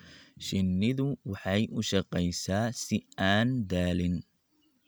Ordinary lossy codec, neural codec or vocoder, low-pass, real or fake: none; none; none; real